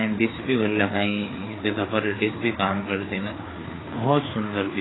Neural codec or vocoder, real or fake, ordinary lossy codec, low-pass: codec, 16 kHz, 4 kbps, FreqCodec, larger model; fake; AAC, 16 kbps; 7.2 kHz